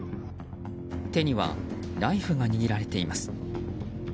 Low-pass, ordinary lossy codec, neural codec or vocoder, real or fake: none; none; none; real